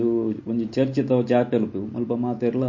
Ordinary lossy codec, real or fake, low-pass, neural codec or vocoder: MP3, 32 kbps; real; 7.2 kHz; none